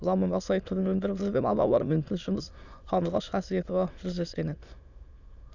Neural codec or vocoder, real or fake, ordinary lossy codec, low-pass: autoencoder, 22.05 kHz, a latent of 192 numbers a frame, VITS, trained on many speakers; fake; none; 7.2 kHz